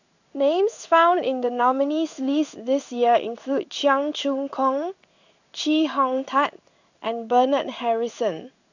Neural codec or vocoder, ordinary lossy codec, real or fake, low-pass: codec, 16 kHz in and 24 kHz out, 1 kbps, XY-Tokenizer; none; fake; 7.2 kHz